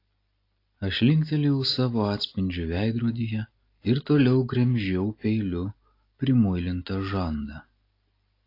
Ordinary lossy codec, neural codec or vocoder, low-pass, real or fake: AAC, 32 kbps; none; 5.4 kHz; real